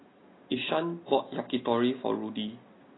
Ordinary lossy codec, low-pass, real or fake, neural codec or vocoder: AAC, 16 kbps; 7.2 kHz; real; none